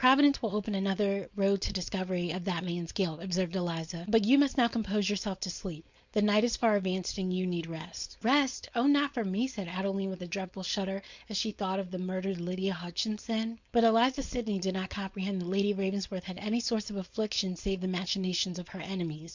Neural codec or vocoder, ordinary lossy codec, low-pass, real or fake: codec, 16 kHz, 4.8 kbps, FACodec; Opus, 64 kbps; 7.2 kHz; fake